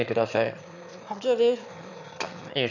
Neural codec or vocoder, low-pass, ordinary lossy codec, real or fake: autoencoder, 22.05 kHz, a latent of 192 numbers a frame, VITS, trained on one speaker; 7.2 kHz; none; fake